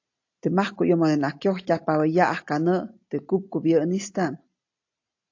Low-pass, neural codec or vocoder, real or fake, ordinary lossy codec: 7.2 kHz; none; real; AAC, 48 kbps